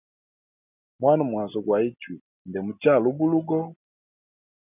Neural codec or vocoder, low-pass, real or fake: none; 3.6 kHz; real